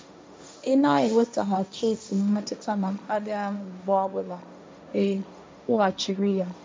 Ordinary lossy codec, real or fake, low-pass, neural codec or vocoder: none; fake; none; codec, 16 kHz, 1.1 kbps, Voila-Tokenizer